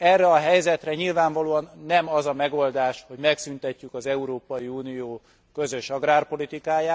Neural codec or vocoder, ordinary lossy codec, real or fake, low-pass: none; none; real; none